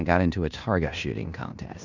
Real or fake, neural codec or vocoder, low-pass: fake; codec, 16 kHz in and 24 kHz out, 0.9 kbps, LongCat-Audio-Codec, fine tuned four codebook decoder; 7.2 kHz